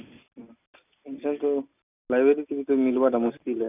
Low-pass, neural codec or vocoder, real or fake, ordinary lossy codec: 3.6 kHz; none; real; none